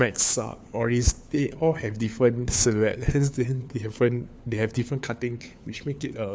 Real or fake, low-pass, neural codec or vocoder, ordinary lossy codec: fake; none; codec, 16 kHz, 4 kbps, FunCodec, trained on LibriTTS, 50 frames a second; none